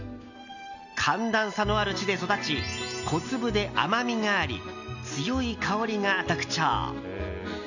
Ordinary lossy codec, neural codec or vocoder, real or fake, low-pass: none; none; real; 7.2 kHz